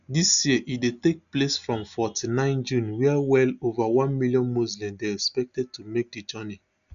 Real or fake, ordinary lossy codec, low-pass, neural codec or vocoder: real; none; 7.2 kHz; none